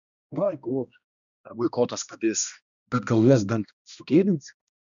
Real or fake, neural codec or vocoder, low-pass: fake; codec, 16 kHz, 1 kbps, X-Codec, HuBERT features, trained on balanced general audio; 7.2 kHz